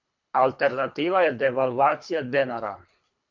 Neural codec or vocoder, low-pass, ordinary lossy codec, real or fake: codec, 24 kHz, 3 kbps, HILCodec; 7.2 kHz; MP3, 48 kbps; fake